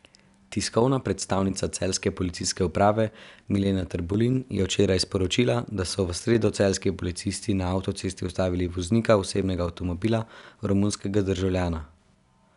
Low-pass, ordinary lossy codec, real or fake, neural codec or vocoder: 10.8 kHz; none; real; none